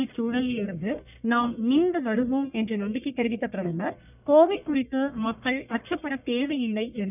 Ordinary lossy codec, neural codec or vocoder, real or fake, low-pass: none; codec, 44.1 kHz, 1.7 kbps, Pupu-Codec; fake; 3.6 kHz